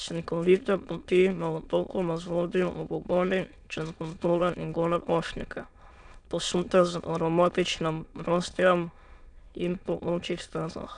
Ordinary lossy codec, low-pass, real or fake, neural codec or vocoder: none; 9.9 kHz; fake; autoencoder, 22.05 kHz, a latent of 192 numbers a frame, VITS, trained on many speakers